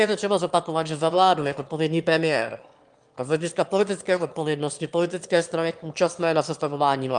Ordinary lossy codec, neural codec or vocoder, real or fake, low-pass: Opus, 32 kbps; autoencoder, 22.05 kHz, a latent of 192 numbers a frame, VITS, trained on one speaker; fake; 9.9 kHz